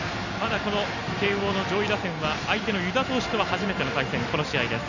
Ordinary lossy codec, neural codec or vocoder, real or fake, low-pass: none; none; real; 7.2 kHz